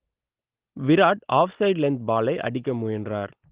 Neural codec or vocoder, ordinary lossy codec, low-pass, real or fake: none; Opus, 16 kbps; 3.6 kHz; real